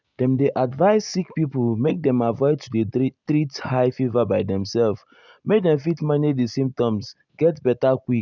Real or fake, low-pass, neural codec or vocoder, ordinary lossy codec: real; 7.2 kHz; none; none